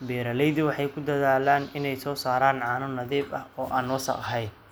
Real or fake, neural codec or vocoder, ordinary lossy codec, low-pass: real; none; none; none